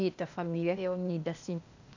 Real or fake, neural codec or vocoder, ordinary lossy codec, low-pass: fake; codec, 16 kHz, 0.8 kbps, ZipCodec; none; 7.2 kHz